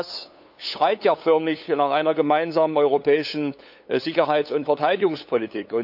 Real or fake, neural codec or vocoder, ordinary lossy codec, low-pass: fake; codec, 16 kHz, 8 kbps, FunCodec, trained on LibriTTS, 25 frames a second; AAC, 48 kbps; 5.4 kHz